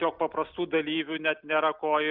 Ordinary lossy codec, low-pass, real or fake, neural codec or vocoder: Opus, 64 kbps; 5.4 kHz; real; none